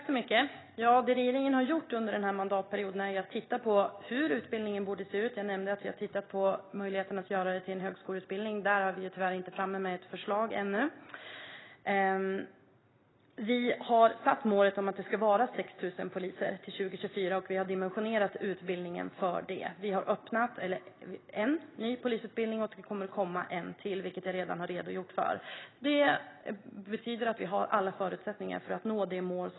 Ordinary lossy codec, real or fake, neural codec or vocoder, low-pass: AAC, 16 kbps; real; none; 7.2 kHz